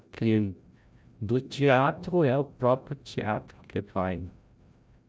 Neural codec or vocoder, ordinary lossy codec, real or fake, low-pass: codec, 16 kHz, 0.5 kbps, FreqCodec, larger model; none; fake; none